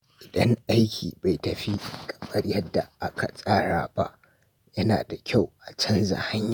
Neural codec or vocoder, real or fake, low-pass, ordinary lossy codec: vocoder, 48 kHz, 128 mel bands, Vocos; fake; none; none